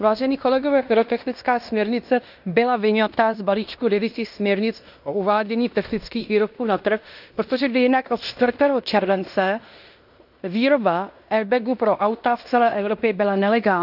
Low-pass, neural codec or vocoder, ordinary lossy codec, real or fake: 5.4 kHz; codec, 16 kHz in and 24 kHz out, 0.9 kbps, LongCat-Audio-Codec, fine tuned four codebook decoder; none; fake